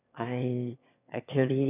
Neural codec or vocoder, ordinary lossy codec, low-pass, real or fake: autoencoder, 22.05 kHz, a latent of 192 numbers a frame, VITS, trained on one speaker; none; 3.6 kHz; fake